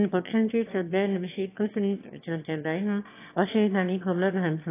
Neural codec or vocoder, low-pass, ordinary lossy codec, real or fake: autoencoder, 22.05 kHz, a latent of 192 numbers a frame, VITS, trained on one speaker; 3.6 kHz; AAC, 24 kbps; fake